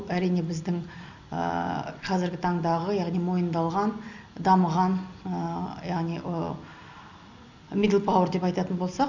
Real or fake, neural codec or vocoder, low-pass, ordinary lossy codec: real; none; 7.2 kHz; none